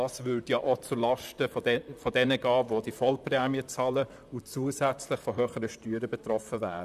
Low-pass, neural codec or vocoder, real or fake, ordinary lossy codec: 14.4 kHz; vocoder, 44.1 kHz, 128 mel bands, Pupu-Vocoder; fake; none